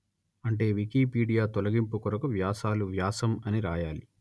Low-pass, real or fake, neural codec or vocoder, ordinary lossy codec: none; real; none; none